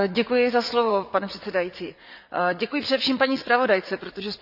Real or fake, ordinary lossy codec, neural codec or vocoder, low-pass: fake; none; vocoder, 44.1 kHz, 80 mel bands, Vocos; 5.4 kHz